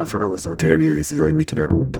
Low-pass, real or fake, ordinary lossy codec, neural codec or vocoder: none; fake; none; codec, 44.1 kHz, 0.9 kbps, DAC